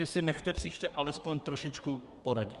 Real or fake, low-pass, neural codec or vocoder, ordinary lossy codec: fake; 10.8 kHz; codec, 24 kHz, 1 kbps, SNAC; Opus, 64 kbps